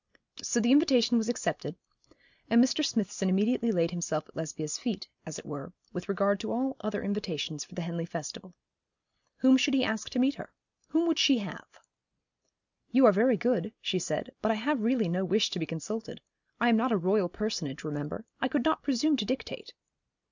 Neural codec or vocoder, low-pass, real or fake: none; 7.2 kHz; real